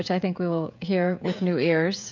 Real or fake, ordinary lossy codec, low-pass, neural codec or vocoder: fake; MP3, 64 kbps; 7.2 kHz; codec, 16 kHz, 16 kbps, FreqCodec, smaller model